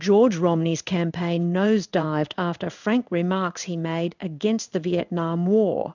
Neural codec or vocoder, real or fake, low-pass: codec, 16 kHz in and 24 kHz out, 1 kbps, XY-Tokenizer; fake; 7.2 kHz